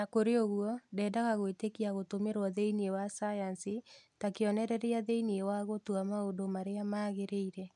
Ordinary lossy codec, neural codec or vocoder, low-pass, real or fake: none; none; 10.8 kHz; real